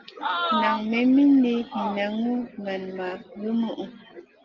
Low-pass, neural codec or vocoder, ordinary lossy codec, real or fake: 7.2 kHz; none; Opus, 32 kbps; real